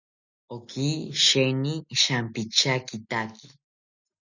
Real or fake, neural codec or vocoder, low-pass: real; none; 7.2 kHz